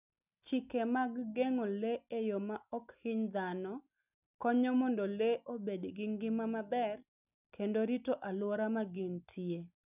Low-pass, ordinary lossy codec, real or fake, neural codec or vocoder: 3.6 kHz; none; real; none